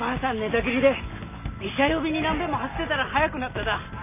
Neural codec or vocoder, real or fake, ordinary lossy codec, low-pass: none; real; none; 3.6 kHz